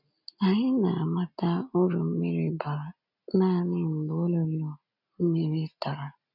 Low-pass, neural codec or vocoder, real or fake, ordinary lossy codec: 5.4 kHz; none; real; none